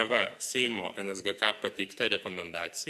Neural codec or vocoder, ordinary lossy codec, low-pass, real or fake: codec, 44.1 kHz, 2.6 kbps, SNAC; AAC, 96 kbps; 14.4 kHz; fake